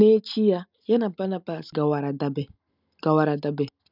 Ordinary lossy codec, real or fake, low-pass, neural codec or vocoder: none; real; 5.4 kHz; none